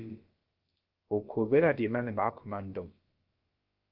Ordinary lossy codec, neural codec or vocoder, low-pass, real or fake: Opus, 24 kbps; codec, 16 kHz, about 1 kbps, DyCAST, with the encoder's durations; 5.4 kHz; fake